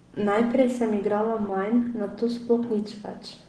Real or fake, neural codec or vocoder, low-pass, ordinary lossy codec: real; none; 9.9 kHz; Opus, 16 kbps